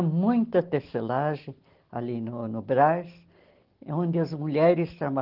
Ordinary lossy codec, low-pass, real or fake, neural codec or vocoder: Opus, 16 kbps; 5.4 kHz; real; none